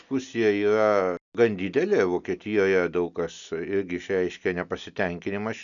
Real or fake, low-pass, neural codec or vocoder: real; 7.2 kHz; none